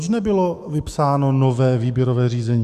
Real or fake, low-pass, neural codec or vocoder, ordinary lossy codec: fake; 14.4 kHz; autoencoder, 48 kHz, 128 numbers a frame, DAC-VAE, trained on Japanese speech; Opus, 64 kbps